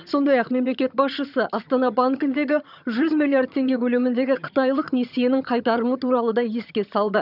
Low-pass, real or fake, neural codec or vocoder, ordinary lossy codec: 5.4 kHz; fake; vocoder, 22.05 kHz, 80 mel bands, HiFi-GAN; none